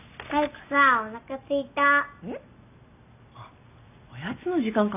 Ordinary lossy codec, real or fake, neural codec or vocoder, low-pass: none; real; none; 3.6 kHz